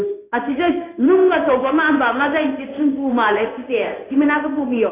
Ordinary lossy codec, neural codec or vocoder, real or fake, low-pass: AAC, 24 kbps; codec, 16 kHz in and 24 kHz out, 1 kbps, XY-Tokenizer; fake; 3.6 kHz